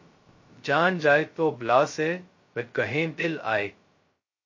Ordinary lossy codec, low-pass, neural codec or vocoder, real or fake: MP3, 32 kbps; 7.2 kHz; codec, 16 kHz, 0.2 kbps, FocalCodec; fake